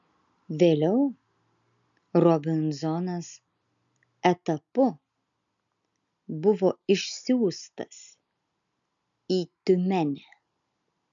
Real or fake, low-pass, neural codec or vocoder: real; 7.2 kHz; none